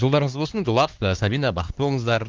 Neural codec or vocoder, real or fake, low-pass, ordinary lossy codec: codec, 16 kHz, 2 kbps, X-Codec, HuBERT features, trained on LibriSpeech; fake; 7.2 kHz; Opus, 16 kbps